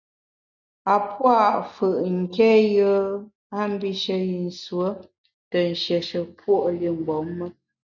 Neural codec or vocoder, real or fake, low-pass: none; real; 7.2 kHz